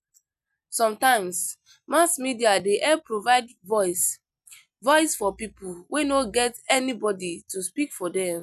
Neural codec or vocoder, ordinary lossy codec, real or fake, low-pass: none; none; real; 14.4 kHz